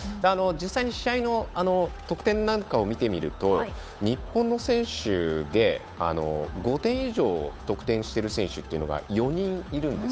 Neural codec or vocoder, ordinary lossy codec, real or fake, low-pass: codec, 16 kHz, 8 kbps, FunCodec, trained on Chinese and English, 25 frames a second; none; fake; none